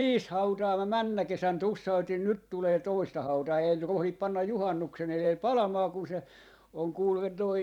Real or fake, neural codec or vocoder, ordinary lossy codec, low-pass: fake; vocoder, 44.1 kHz, 128 mel bands every 512 samples, BigVGAN v2; none; 19.8 kHz